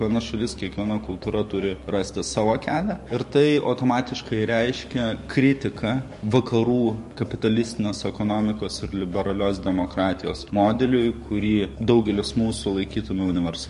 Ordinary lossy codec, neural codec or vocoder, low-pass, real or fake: MP3, 48 kbps; codec, 44.1 kHz, 7.8 kbps, DAC; 14.4 kHz; fake